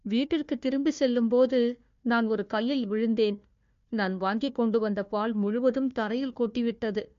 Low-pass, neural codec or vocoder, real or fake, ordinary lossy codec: 7.2 kHz; codec, 16 kHz, 1 kbps, FunCodec, trained on Chinese and English, 50 frames a second; fake; MP3, 48 kbps